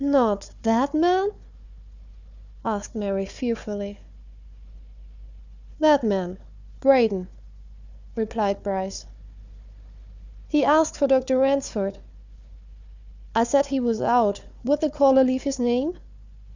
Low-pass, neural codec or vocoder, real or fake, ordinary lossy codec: 7.2 kHz; codec, 16 kHz, 4 kbps, FunCodec, trained on Chinese and English, 50 frames a second; fake; Opus, 64 kbps